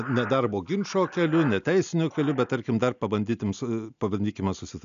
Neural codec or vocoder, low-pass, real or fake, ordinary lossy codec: none; 7.2 kHz; real; AAC, 96 kbps